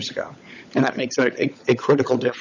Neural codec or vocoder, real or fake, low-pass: codec, 16 kHz, 16 kbps, FunCodec, trained on LibriTTS, 50 frames a second; fake; 7.2 kHz